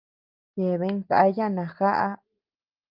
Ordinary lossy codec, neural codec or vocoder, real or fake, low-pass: Opus, 24 kbps; none; real; 5.4 kHz